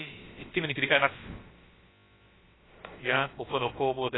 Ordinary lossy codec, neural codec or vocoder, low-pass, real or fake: AAC, 16 kbps; codec, 16 kHz, about 1 kbps, DyCAST, with the encoder's durations; 7.2 kHz; fake